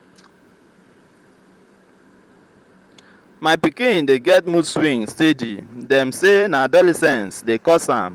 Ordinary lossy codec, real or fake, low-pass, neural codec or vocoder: Opus, 32 kbps; fake; 19.8 kHz; vocoder, 44.1 kHz, 128 mel bands, Pupu-Vocoder